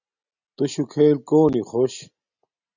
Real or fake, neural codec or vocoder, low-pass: real; none; 7.2 kHz